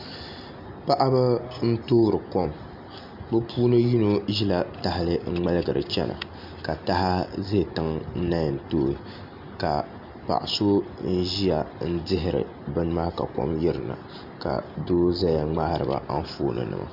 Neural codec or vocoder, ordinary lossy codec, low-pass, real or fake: none; MP3, 48 kbps; 5.4 kHz; real